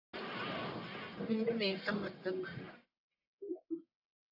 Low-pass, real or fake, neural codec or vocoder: 5.4 kHz; fake; codec, 44.1 kHz, 1.7 kbps, Pupu-Codec